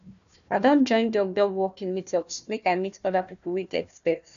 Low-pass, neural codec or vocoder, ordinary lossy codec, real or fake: 7.2 kHz; codec, 16 kHz, 1 kbps, FunCodec, trained on Chinese and English, 50 frames a second; none; fake